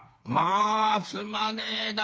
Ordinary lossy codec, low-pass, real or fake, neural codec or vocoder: none; none; fake; codec, 16 kHz, 4 kbps, FreqCodec, smaller model